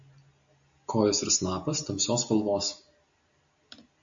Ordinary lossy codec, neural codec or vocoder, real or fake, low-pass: AAC, 64 kbps; none; real; 7.2 kHz